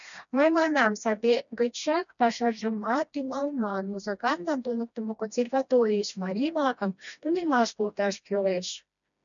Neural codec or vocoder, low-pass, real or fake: codec, 16 kHz, 1 kbps, FreqCodec, smaller model; 7.2 kHz; fake